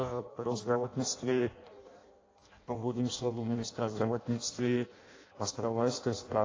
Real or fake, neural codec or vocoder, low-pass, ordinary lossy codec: fake; codec, 16 kHz in and 24 kHz out, 0.6 kbps, FireRedTTS-2 codec; 7.2 kHz; AAC, 32 kbps